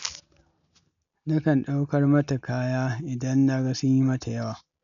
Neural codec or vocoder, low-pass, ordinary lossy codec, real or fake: none; 7.2 kHz; none; real